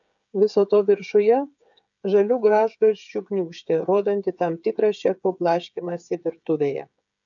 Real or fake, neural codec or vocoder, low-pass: fake; codec, 16 kHz, 8 kbps, FreqCodec, smaller model; 7.2 kHz